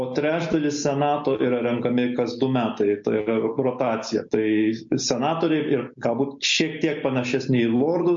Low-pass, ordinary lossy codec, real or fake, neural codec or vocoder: 7.2 kHz; MP3, 48 kbps; real; none